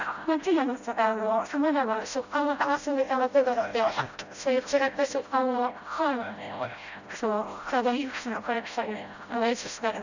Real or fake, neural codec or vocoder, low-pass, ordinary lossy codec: fake; codec, 16 kHz, 0.5 kbps, FreqCodec, smaller model; 7.2 kHz; none